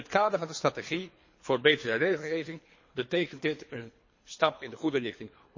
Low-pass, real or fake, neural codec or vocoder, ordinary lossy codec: 7.2 kHz; fake; codec, 24 kHz, 3 kbps, HILCodec; MP3, 32 kbps